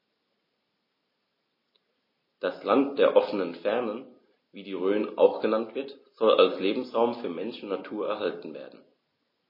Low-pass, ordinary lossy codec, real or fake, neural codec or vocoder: 5.4 kHz; MP3, 24 kbps; real; none